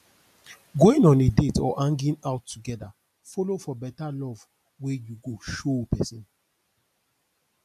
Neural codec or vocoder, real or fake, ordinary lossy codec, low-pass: none; real; none; 14.4 kHz